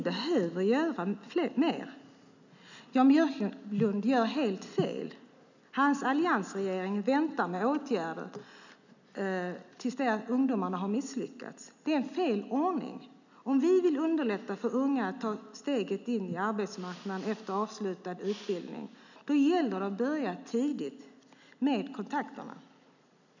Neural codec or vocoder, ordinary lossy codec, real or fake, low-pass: none; none; real; 7.2 kHz